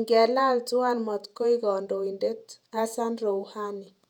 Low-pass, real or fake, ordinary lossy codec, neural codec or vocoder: 19.8 kHz; fake; none; vocoder, 48 kHz, 128 mel bands, Vocos